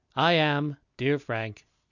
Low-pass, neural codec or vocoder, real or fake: 7.2 kHz; none; real